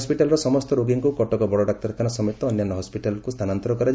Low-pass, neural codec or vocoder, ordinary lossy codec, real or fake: none; none; none; real